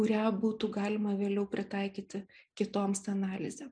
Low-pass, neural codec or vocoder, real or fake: 9.9 kHz; none; real